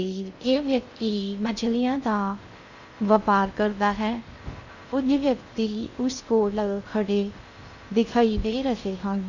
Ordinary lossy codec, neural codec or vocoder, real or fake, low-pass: none; codec, 16 kHz in and 24 kHz out, 0.6 kbps, FocalCodec, streaming, 2048 codes; fake; 7.2 kHz